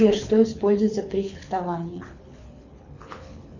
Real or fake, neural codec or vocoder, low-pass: fake; codec, 24 kHz, 6 kbps, HILCodec; 7.2 kHz